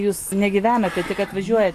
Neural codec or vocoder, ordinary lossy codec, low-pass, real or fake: none; AAC, 64 kbps; 14.4 kHz; real